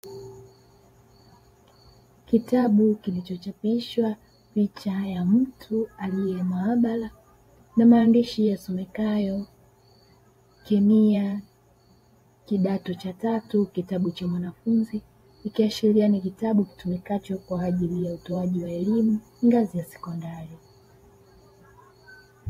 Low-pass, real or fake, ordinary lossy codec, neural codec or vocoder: 19.8 kHz; fake; AAC, 48 kbps; vocoder, 44.1 kHz, 128 mel bands every 512 samples, BigVGAN v2